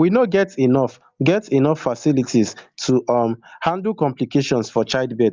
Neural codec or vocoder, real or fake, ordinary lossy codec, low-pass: none; real; Opus, 24 kbps; 7.2 kHz